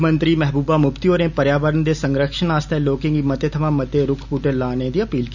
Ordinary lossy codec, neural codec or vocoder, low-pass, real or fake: none; none; 7.2 kHz; real